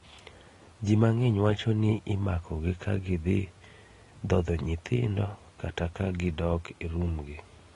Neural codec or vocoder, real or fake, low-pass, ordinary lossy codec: none; real; 10.8 kHz; AAC, 32 kbps